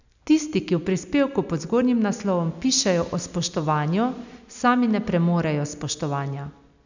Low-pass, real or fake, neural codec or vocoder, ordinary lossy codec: 7.2 kHz; real; none; none